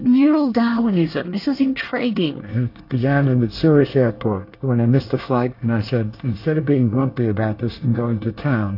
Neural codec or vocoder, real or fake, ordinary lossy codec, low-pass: codec, 24 kHz, 1 kbps, SNAC; fake; AAC, 32 kbps; 5.4 kHz